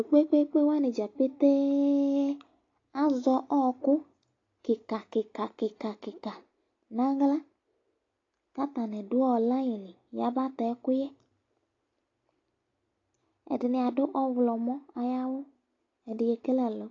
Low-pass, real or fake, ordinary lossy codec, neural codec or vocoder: 7.2 kHz; real; AAC, 32 kbps; none